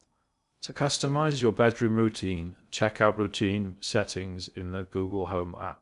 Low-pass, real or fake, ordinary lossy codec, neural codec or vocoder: 10.8 kHz; fake; none; codec, 16 kHz in and 24 kHz out, 0.6 kbps, FocalCodec, streaming, 2048 codes